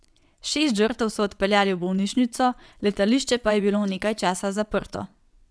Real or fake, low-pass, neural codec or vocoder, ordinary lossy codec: fake; none; vocoder, 22.05 kHz, 80 mel bands, WaveNeXt; none